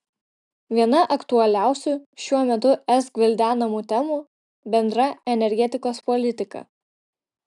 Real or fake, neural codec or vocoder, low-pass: real; none; 10.8 kHz